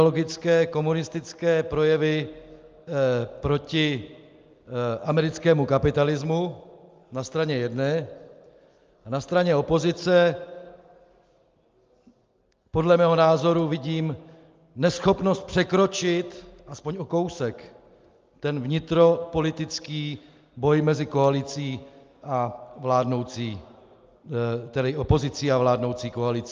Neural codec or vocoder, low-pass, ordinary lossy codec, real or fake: none; 7.2 kHz; Opus, 24 kbps; real